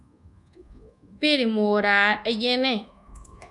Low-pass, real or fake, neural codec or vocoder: 10.8 kHz; fake; codec, 24 kHz, 1.2 kbps, DualCodec